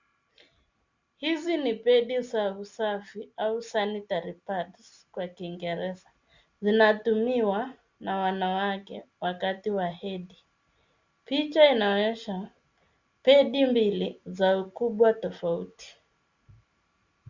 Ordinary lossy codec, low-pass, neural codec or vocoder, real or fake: Opus, 64 kbps; 7.2 kHz; none; real